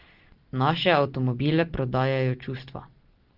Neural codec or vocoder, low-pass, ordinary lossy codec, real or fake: none; 5.4 kHz; Opus, 16 kbps; real